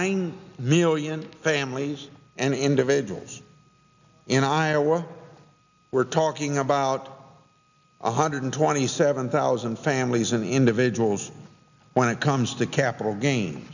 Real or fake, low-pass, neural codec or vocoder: real; 7.2 kHz; none